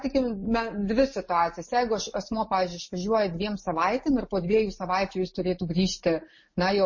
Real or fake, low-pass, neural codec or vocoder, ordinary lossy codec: real; 7.2 kHz; none; MP3, 32 kbps